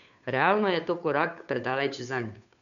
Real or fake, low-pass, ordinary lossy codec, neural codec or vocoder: fake; 7.2 kHz; none; codec, 16 kHz, 2 kbps, FunCodec, trained on Chinese and English, 25 frames a second